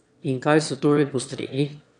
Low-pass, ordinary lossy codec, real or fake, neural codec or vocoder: 9.9 kHz; none; fake; autoencoder, 22.05 kHz, a latent of 192 numbers a frame, VITS, trained on one speaker